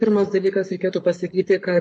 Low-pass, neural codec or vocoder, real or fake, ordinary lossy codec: 10.8 kHz; codec, 44.1 kHz, 7.8 kbps, Pupu-Codec; fake; MP3, 48 kbps